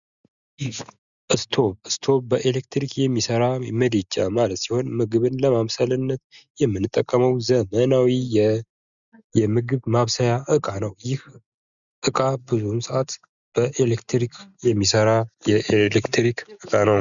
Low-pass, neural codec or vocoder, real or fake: 7.2 kHz; none; real